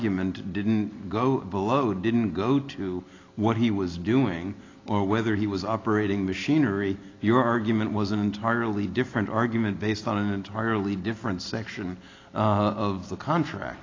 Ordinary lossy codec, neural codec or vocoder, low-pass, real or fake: AAC, 32 kbps; none; 7.2 kHz; real